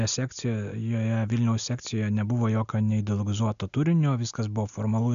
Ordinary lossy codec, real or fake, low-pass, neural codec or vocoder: AAC, 96 kbps; real; 7.2 kHz; none